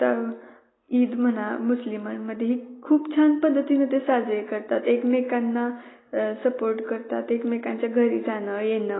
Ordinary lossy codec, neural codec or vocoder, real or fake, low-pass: AAC, 16 kbps; none; real; 7.2 kHz